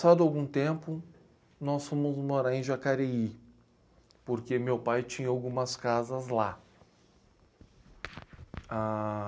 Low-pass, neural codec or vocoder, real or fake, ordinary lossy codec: none; none; real; none